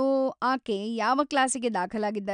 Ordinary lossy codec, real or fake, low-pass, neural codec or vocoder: none; real; 9.9 kHz; none